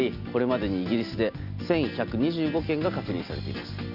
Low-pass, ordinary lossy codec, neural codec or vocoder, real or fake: 5.4 kHz; none; none; real